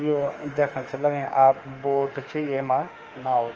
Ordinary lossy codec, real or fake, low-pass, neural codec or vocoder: Opus, 24 kbps; fake; 7.2 kHz; autoencoder, 48 kHz, 32 numbers a frame, DAC-VAE, trained on Japanese speech